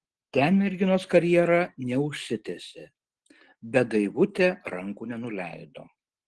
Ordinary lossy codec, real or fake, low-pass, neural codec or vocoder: Opus, 16 kbps; real; 10.8 kHz; none